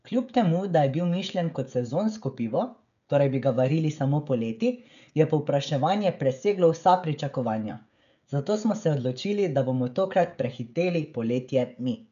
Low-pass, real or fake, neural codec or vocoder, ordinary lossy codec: 7.2 kHz; fake; codec, 16 kHz, 16 kbps, FreqCodec, smaller model; none